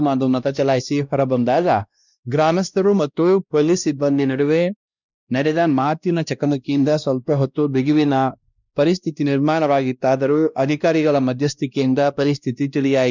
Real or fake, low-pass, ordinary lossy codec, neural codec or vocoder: fake; 7.2 kHz; none; codec, 16 kHz, 1 kbps, X-Codec, WavLM features, trained on Multilingual LibriSpeech